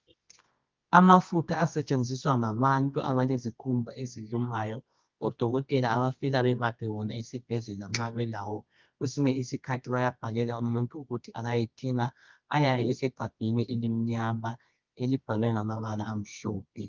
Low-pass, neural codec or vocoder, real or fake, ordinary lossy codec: 7.2 kHz; codec, 24 kHz, 0.9 kbps, WavTokenizer, medium music audio release; fake; Opus, 32 kbps